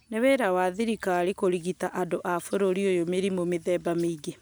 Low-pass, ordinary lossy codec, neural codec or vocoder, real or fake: none; none; none; real